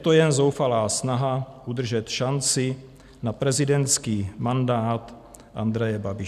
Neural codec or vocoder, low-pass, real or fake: none; 14.4 kHz; real